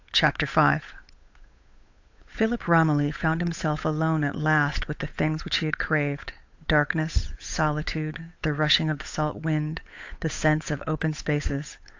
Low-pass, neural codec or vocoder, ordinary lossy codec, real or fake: 7.2 kHz; codec, 16 kHz, 8 kbps, FunCodec, trained on Chinese and English, 25 frames a second; AAC, 48 kbps; fake